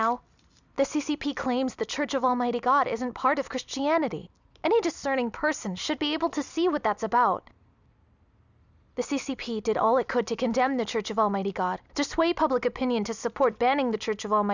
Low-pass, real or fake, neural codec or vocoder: 7.2 kHz; real; none